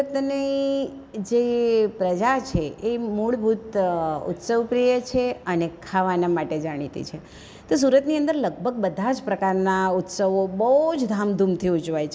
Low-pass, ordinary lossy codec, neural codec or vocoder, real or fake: none; none; none; real